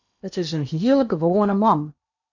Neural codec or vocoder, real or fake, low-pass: codec, 16 kHz in and 24 kHz out, 0.8 kbps, FocalCodec, streaming, 65536 codes; fake; 7.2 kHz